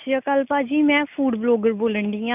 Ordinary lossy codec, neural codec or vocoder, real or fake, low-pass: none; none; real; 3.6 kHz